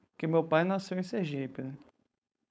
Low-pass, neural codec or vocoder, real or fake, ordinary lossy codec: none; codec, 16 kHz, 4.8 kbps, FACodec; fake; none